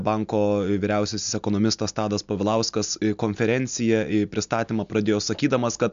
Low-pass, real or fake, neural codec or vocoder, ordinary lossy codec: 7.2 kHz; real; none; MP3, 64 kbps